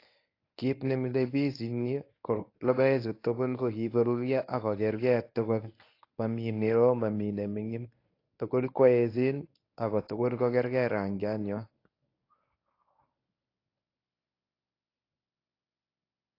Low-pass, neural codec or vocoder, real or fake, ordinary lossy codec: 5.4 kHz; codec, 24 kHz, 0.9 kbps, WavTokenizer, medium speech release version 1; fake; AAC, 32 kbps